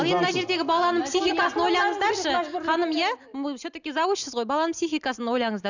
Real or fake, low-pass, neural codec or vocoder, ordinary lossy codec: real; 7.2 kHz; none; none